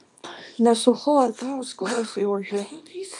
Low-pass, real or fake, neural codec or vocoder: 10.8 kHz; fake; codec, 24 kHz, 0.9 kbps, WavTokenizer, small release